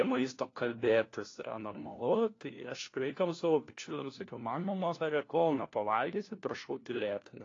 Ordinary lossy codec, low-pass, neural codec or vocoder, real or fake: AAC, 32 kbps; 7.2 kHz; codec, 16 kHz, 1 kbps, FunCodec, trained on LibriTTS, 50 frames a second; fake